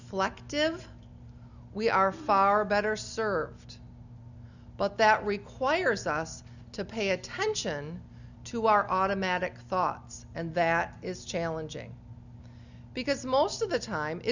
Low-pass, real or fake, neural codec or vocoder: 7.2 kHz; real; none